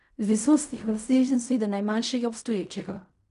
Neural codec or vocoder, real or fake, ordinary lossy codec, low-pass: codec, 16 kHz in and 24 kHz out, 0.4 kbps, LongCat-Audio-Codec, fine tuned four codebook decoder; fake; none; 10.8 kHz